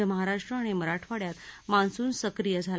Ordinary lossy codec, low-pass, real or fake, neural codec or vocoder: none; none; real; none